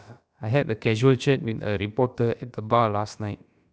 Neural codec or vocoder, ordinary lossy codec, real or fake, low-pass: codec, 16 kHz, about 1 kbps, DyCAST, with the encoder's durations; none; fake; none